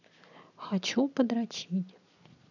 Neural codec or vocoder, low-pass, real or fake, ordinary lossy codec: none; 7.2 kHz; real; none